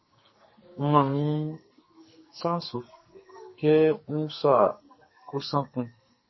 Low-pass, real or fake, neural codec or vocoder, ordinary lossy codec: 7.2 kHz; fake; codec, 44.1 kHz, 2.6 kbps, SNAC; MP3, 24 kbps